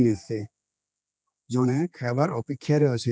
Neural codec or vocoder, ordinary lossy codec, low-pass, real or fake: codec, 16 kHz, 2 kbps, X-Codec, HuBERT features, trained on general audio; none; none; fake